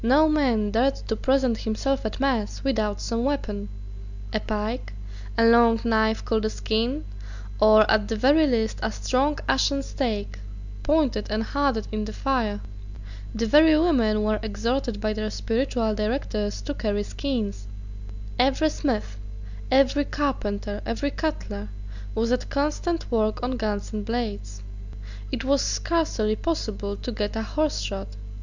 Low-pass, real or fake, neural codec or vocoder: 7.2 kHz; real; none